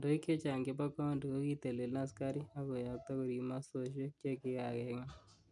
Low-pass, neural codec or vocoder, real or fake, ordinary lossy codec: 10.8 kHz; none; real; none